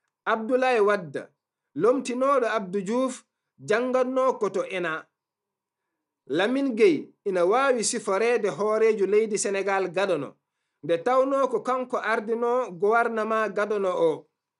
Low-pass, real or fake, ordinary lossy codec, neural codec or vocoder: 10.8 kHz; real; none; none